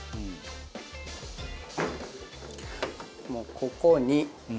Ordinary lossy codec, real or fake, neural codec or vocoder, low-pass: none; real; none; none